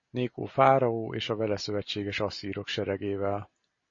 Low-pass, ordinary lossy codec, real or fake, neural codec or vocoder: 7.2 kHz; MP3, 32 kbps; real; none